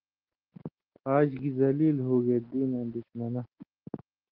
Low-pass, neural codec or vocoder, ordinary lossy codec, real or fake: 5.4 kHz; none; Opus, 16 kbps; real